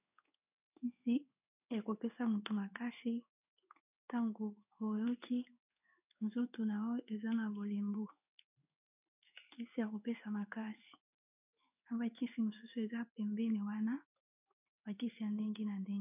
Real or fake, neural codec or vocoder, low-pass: fake; codec, 16 kHz in and 24 kHz out, 1 kbps, XY-Tokenizer; 3.6 kHz